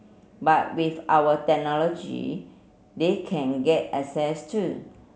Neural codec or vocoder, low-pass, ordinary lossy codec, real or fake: none; none; none; real